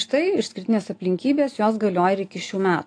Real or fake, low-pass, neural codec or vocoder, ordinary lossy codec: real; 9.9 kHz; none; AAC, 48 kbps